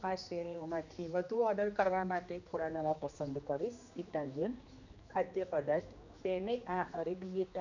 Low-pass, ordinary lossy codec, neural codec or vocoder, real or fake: 7.2 kHz; none; codec, 16 kHz, 2 kbps, X-Codec, HuBERT features, trained on general audio; fake